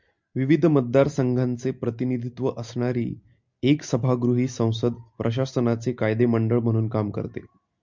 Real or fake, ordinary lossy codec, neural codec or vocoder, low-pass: real; MP3, 64 kbps; none; 7.2 kHz